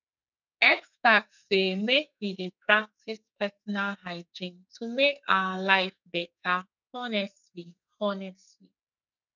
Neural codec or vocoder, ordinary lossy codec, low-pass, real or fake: codec, 44.1 kHz, 2.6 kbps, SNAC; none; 7.2 kHz; fake